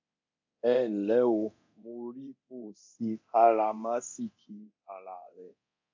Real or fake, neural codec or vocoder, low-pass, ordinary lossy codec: fake; codec, 24 kHz, 0.9 kbps, DualCodec; 7.2 kHz; MP3, 48 kbps